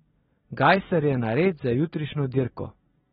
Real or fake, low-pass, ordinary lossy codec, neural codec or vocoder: real; 19.8 kHz; AAC, 16 kbps; none